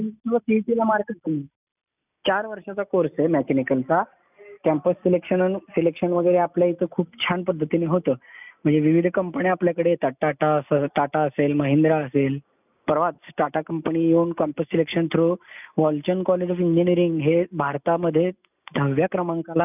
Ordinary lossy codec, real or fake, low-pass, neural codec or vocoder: none; real; 3.6 kHz; none